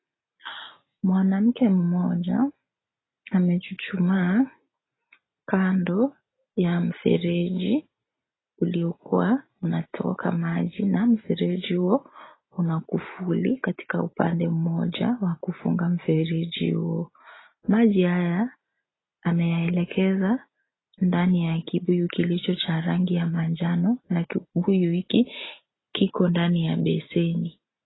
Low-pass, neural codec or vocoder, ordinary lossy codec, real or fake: 7.2 kHz; none; AAC, 16 kbps; real